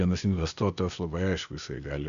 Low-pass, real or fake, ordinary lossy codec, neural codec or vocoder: 7.2 kHz; fake; AAC, 96 kbps; codec, 16 kHz, 0.8 kbps, ZipCodec